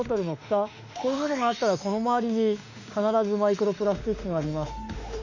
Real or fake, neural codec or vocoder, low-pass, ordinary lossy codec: fake; autoencoder, 48 kHz, 32 numbers a frame, DAC-VAE, trained on Japanese speech; 7.2 kHz; none